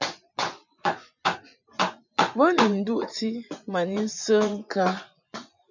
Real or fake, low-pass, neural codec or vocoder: fake; 7.2 kHz; vocoder, 24 kHz, 100 mel bands, Vocos